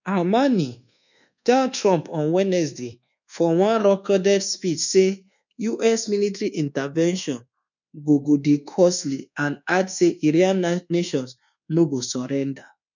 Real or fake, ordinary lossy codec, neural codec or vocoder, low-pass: fake; none; codec, 24 kHz, 1.2 kbps, DualCodec; 7.2 kHz